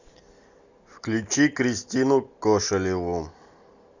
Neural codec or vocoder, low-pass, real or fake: none; 7.2 kHz; real